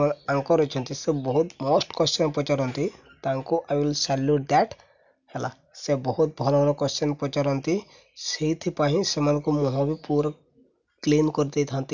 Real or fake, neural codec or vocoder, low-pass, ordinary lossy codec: real; none; 7.2 kHz; none